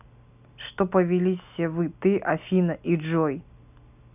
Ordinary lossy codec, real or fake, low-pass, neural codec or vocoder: none; real; 3.6 kHz; none